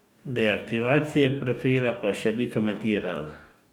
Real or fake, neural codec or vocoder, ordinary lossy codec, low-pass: fake; codec, 44.1 kHz, 2.6 kbps, DAC; none; 19.8 kHz